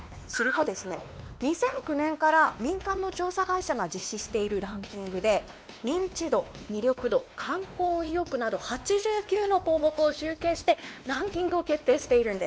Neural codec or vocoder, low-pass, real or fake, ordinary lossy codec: codec, 16 kHz, 2 kbps, X-Codec, WavLM features, trained on Multilingual LibriSpeech; none; fake; none